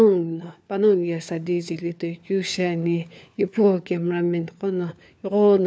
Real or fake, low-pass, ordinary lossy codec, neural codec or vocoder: fake; none; none; codec, 16 kHz, 4 kbps, FunCodec, trained on LibriTTS, 50 frames a second